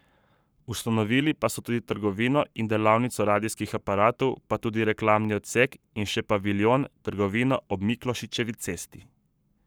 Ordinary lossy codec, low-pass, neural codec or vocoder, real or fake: none; none; codec, 44.1 kHz, 7.8 kbps, Pupu-Codec; fake